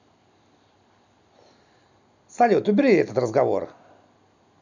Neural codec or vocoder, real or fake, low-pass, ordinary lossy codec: none; real; 7.2 kHz; none